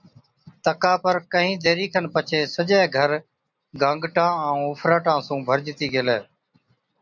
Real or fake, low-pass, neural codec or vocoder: real; 7.2 kHz; none